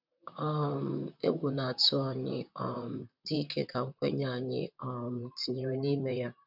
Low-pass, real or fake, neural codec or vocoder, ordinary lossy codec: 5.4 kHz; fake; vocoder, 44.1 kHz, 128 mel bands, Pupu-Vocoder; none